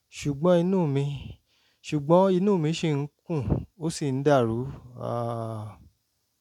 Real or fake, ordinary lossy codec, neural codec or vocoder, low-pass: real; none; none; 19.8 kHz